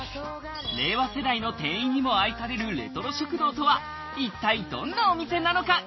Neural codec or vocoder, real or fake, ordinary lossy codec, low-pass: none; real; MP3, 24 kbps; 7.2 kHz